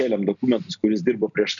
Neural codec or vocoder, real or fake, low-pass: none; real; 7.2 kHz